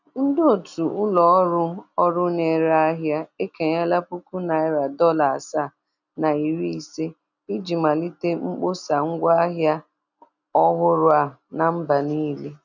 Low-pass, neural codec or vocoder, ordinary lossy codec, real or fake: 7.2 kHz; none; none; real